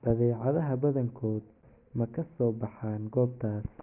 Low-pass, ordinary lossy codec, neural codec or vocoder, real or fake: 3.6 kHz; Opus, 32 kbps; none; real